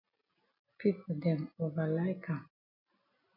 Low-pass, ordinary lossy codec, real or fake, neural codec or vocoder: 5.4 kHz; MP3, 32 kbps; real; none